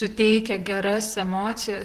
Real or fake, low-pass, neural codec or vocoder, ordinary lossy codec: fake; 14.4 kHz; vocoder, 44.1 kHz, 128 mel bands, Pupu-Vocoder; Opus, 16 kbps